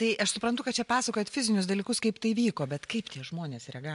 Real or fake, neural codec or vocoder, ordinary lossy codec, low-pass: real; none; MP3, 64 kbps; 10.8 kHz